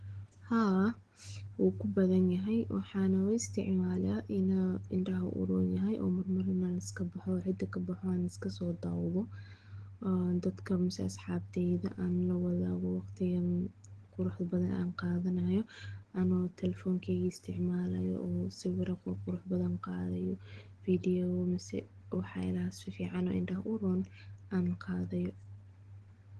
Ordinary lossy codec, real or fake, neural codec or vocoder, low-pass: Opus, 16 kbps; real; none; 9.9 kHz